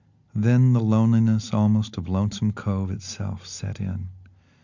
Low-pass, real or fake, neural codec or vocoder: 7.2 kHz; real; none